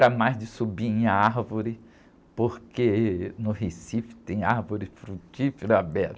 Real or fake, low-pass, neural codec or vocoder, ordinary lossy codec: real; none; none; none